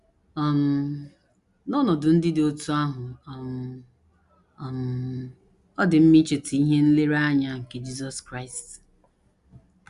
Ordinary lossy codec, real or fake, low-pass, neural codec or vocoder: Opus, 64 kbps; real; 10.8 kHz; none